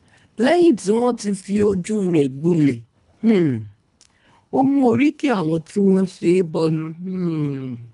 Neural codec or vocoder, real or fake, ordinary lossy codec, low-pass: codec, 24 kHz, 1.5 kbps, HILCodec; fake; none; 10.8 kHz